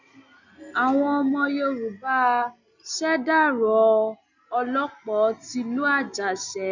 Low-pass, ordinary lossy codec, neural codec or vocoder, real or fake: 7.2 kHz; none; none; real